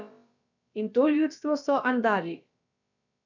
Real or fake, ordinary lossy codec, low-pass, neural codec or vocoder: fake; none; 7.2 kHz; codec, 16 kHz, about 1 kbps, DyCAST, with the encoder's durations